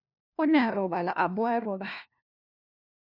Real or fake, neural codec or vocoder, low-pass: fake; codec, 16 kHz, 1 kbps, FunCodec, trained on LibriTTS, 50 frames a second; 5.4 kHz